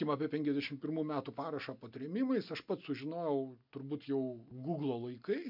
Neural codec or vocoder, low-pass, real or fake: none; 5.4 kHz; real